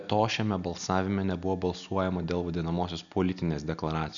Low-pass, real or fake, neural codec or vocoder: 7.2 kHz; real; none